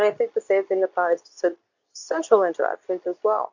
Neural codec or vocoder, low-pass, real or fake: codec, 24 kHz, 0.9 kbps, WavTokenizer, medium speech release version 2; 7.2 kHz; fake